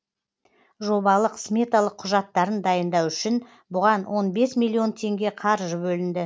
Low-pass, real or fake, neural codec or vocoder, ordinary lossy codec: none; real; none; none